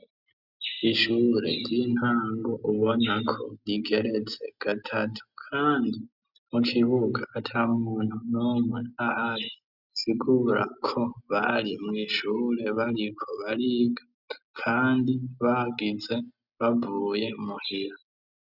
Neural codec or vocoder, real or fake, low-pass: none; real; 5.4 kHz